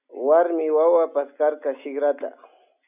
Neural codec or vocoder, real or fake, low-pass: none; real; 3.6 kHz